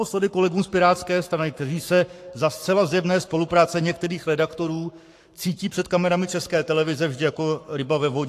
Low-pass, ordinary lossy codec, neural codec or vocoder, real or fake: 14.4 kHz; AAC, 64 kbps; codec, 44.1 kHz, 7.8 kbps, Pupu-Codec; fake